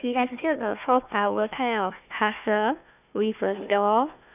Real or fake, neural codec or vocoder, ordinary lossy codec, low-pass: fake; codec, 16 kHz, 1 kbps, FunCodec, trained on Chinese and English, 50 frames a second; none; 3.6 kHz